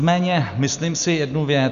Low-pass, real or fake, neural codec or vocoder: 7.2 kHz; real; none